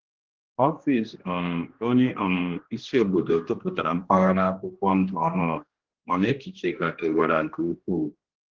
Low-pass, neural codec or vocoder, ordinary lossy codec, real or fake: 7.2 kHz; codec, 16 kHz, 1 kbps, X-Codec, HuBERT features, trained on general audio; Opus, 16 kbps; fake